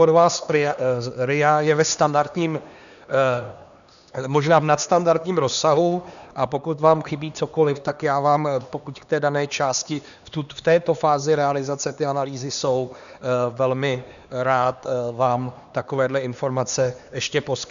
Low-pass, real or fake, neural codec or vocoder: 7.2 kHz; fake; codec, 16 kHz, 2 kbps, X-Codec, HuBERT features, trained on LibriSpeech